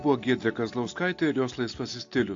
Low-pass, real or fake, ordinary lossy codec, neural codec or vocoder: 7.2 kHz; real; AAC, 48 kbps; none